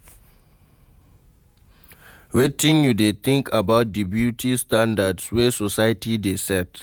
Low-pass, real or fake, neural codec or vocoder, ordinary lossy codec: none; fake; vocoder, 48 kHz, 128 mel bands, Vocos; none